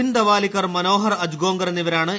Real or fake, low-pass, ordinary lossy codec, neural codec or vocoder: real; none; none; none